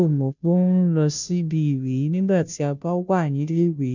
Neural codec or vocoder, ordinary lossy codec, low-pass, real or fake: codec, 16 kHz, 0.5 kbps, FunCodec, trained on Chinese and English, 25 frames a second; none; 7.2 kHz; fake